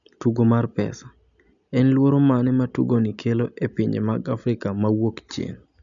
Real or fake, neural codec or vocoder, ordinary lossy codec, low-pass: real; none; none; 7.2 kHz